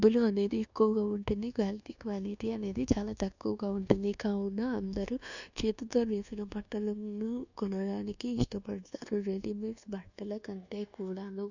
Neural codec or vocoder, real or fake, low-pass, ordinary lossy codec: codec, 24 kHz, 1.2 kbps, DualCodec; fake; 7.2 kHz; none